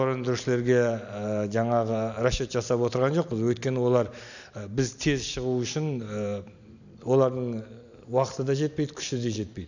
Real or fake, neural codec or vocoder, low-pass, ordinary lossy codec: real; none; 7.2 kHz; none